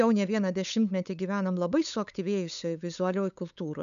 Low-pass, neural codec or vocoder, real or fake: 7.2 kHz; codec, 16 kHz, 8 kbps, FunCodec, trained on LibriTTS, 25 frames a second; fake